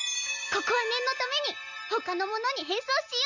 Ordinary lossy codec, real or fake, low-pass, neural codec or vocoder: none; real; 7.2 kHz; none